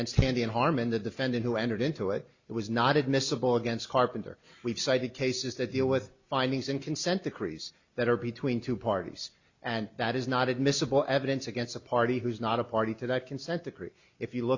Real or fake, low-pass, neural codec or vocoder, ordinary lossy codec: real; 7.2 kHz; none; Opus, 64 kbps